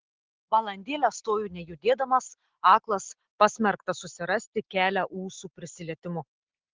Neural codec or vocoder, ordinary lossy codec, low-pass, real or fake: none; Opus, 16 kbps; 7.2 kHz; real